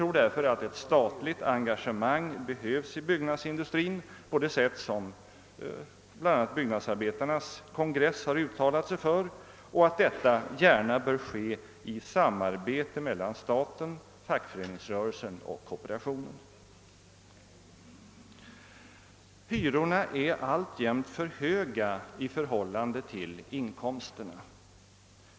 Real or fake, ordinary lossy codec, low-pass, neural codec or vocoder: real; none; none; none